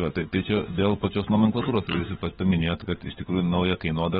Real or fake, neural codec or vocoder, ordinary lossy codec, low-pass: fake; codec, 16 kHz, 16 kbps, FunCodec, trained on LibriTTS, 50 frames a second; AAC, 16 kbps; 7.2 kHz